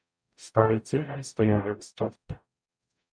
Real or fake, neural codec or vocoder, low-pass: fake; codec, 44.1 kHz, 0.9 kbps, DAC; 9.9 kHz